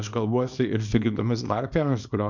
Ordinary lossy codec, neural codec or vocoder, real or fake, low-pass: MP3, 64 kbps; codec, 24 kHz, 0.9 kbps, WavTokenizer, small release; fake; 7.2 kHz